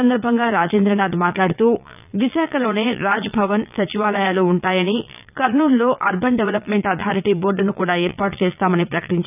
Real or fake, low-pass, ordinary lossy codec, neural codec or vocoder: fake; 3.6 kHz; none; vocoder, 22.05 kHz, 80 mel bands, Vocos